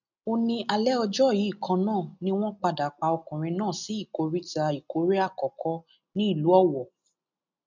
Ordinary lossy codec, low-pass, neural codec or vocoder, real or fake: none; 7.2 kHz; none; real